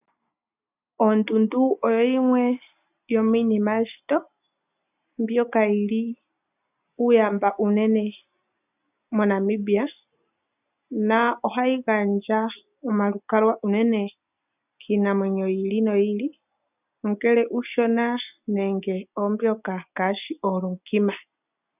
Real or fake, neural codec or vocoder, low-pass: real; none; 3.6 kHz